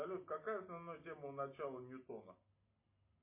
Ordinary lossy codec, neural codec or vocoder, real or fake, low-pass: MP3, 24 kbps; none; real; 3.6 kHz